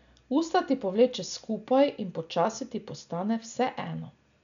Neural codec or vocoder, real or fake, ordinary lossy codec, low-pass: none; real; none; 7.2 kHz